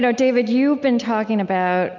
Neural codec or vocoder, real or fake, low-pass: none; real; 7.2 kHz